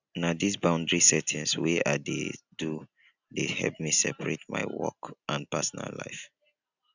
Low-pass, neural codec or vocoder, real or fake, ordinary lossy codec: 7.2 kHz; none; real; none